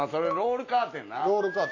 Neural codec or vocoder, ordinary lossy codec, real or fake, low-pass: none; AAC, 32 kbps; real; 7.2 kHz